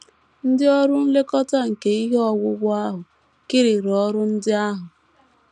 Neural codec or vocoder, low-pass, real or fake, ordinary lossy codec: none; 10.8 kHz; real; none